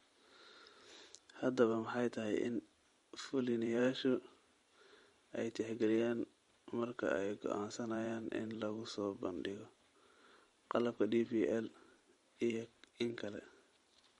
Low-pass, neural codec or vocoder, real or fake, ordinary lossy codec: 19.8 kHz; vocoder, 44.1 kHz, 128 mel bands every 512 samples, BigVGAN v2; fake; MP3, 48 kbps